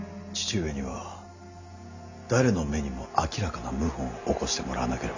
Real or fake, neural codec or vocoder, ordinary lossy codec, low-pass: real; none; none; 7.2 kHz